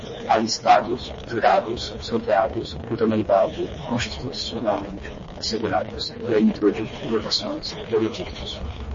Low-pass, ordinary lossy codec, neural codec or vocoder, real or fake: 7.2 kHz; MP3, 32 kbps; codec, 16 kHz, 2 kbps, FreqCodec, smaller model; fake